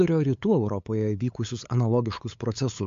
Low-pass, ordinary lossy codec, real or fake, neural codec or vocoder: 7.2 kHz; MP3, 48 kbps; fake; codec, 16 kHz, 8 kbps, FunCodec, trained on LibriTTS, 25 frames a second